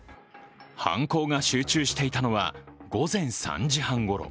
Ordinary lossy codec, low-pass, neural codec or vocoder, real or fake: none; none; none; real